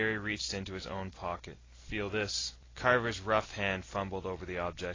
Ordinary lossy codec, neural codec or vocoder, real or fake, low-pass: AAC, 32 kbps; none; real; 7.2 kHz